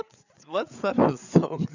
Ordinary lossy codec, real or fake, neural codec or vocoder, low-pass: none; fake; codec, 16 kHz, 8 kbps, FreqCodec, larger model; 7.2 kHz